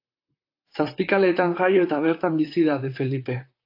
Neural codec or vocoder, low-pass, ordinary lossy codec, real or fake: vocoder, 44.1 kHz, 128 mel bands, Pupu-Vocoder; 5.4 kHz; AAC, 32 kbps; fake